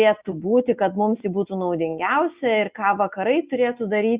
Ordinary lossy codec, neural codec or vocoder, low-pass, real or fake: Opus, 64 kbps; none; 3.6 kHz; real